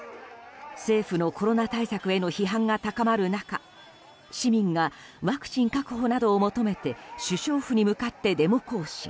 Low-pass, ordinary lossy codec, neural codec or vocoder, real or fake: none; none; none; real